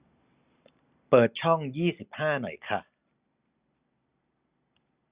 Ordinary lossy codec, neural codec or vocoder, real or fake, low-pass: Opus, 64 kbps; none; real; 3.6 kHz